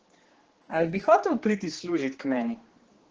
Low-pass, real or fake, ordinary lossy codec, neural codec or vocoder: 7.2 kHz; fake; Opus, 16 kbps; codec, 16 kHz, 2 kbps, X-Codec, HuBERT features, trained on general audio